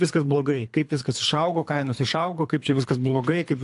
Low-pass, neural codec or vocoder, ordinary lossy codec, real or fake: 10.8 kHz; codec, 24 kHz, 3 kbps, HILCodec; AAC, 64 kbps; fake